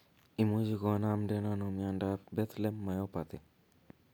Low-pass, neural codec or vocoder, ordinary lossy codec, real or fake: none; none; none; real